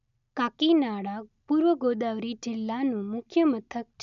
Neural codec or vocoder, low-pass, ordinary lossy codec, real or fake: none; 7.2 kHz; none; real